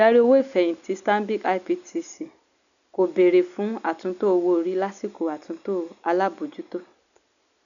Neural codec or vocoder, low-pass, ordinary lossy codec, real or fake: none; 7.2 kHz; none; real